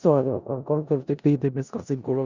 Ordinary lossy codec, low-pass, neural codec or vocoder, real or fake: Opus, 64 kbps; 7.2 kHz; codec, 16 kHz in and 24 kHz out, 0.4 kbps, LongCat-Audio-Codec, four codebook decoder; fake